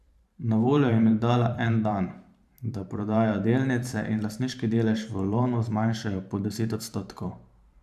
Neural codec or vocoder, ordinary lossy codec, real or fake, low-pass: vocoder, 44.1 kHz, 128 mel bands every 512 samples, BigVGAN v2; AAC, 96 kbps; fake; 14.4 kHz